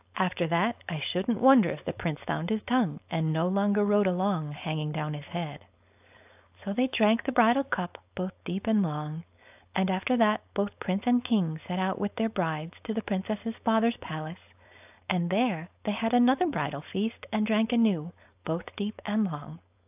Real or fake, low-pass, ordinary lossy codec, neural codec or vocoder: fake; 3.6 kHz; AAC, 32 kbps; codec, 16 kHz, 4.8 kbps, FACodec